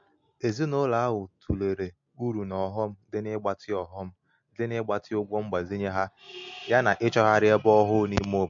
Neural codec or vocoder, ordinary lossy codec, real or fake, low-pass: none; MP3, 48 kbps; real; 7.2 kHz